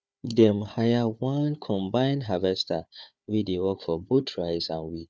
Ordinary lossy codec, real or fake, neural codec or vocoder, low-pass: none; fake; codec, 16 kHz, 4 kbps, FunCodec, trained on Chinese and English, 50 frames a second; none